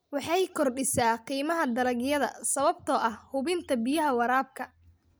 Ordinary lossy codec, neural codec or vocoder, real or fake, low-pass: none; none; real; none